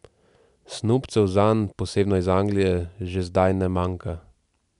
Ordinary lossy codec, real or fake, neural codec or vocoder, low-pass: MP3, 96 kbps; real; none; 10.8 kHz